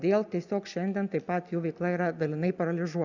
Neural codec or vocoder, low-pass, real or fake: none; 7.2 kHz; real